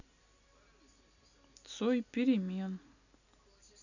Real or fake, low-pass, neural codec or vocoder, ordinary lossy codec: real; 7.2 kHz; none; none